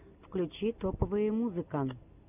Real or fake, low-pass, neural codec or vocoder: real; 3.6 kHz; none